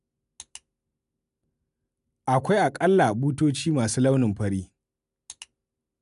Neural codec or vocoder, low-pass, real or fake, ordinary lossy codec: none; 10.8 kHz; real; none